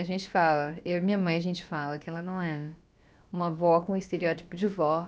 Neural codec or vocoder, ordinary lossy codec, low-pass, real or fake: codec, 16 kHz, about 1 kbps, DyCAST, with the encoder's durations; none; none; fake